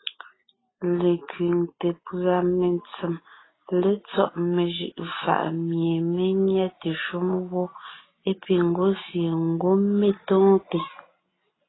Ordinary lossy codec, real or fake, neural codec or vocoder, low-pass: AAC, 16 kbps; real; none; 7.2 kHz